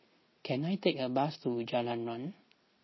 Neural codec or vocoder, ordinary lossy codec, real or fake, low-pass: none; MP3, 24 kbps; real; 7.2 kHz